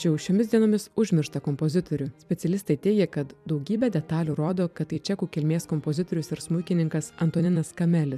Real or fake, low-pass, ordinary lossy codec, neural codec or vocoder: fake; 14.4 kHz; MP3, 96 kbps; vocoder, 44.1 kHz, 128 mel bands every 256 samples, BigVGAN v2